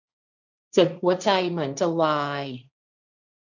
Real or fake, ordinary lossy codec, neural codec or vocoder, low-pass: fake; none; codec, 16 kHz, 1.1 kbps, Voila-Tokenizer; 7.2 kHz